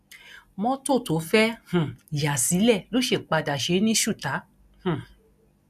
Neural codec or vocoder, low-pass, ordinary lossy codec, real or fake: none; 14.4 kHz; none; real